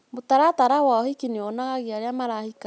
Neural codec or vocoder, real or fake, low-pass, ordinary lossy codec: none; real; none; none